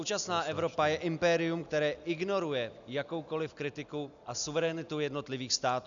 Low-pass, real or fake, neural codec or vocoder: 7.2 kHz; real; none